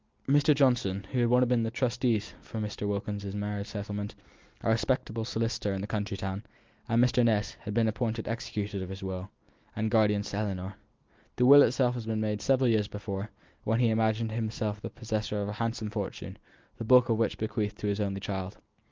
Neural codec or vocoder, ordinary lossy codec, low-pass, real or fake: none; Opus, 32 kbps; 7.2 kHz; real